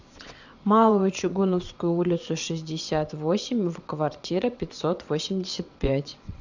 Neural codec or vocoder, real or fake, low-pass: vocoder, 22.05 kHz, 80 mel bands, WaveNeXt; fake; 7.2 kHz